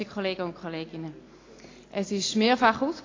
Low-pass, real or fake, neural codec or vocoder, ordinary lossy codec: 7.2 kHz; real; none; AAC, 32 kbps